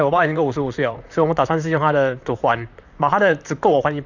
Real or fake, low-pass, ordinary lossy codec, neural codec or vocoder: fake; 7.2 kHz; none; vocoder, 44.1 kHz, 128 mel bands, Pupu-Vocoder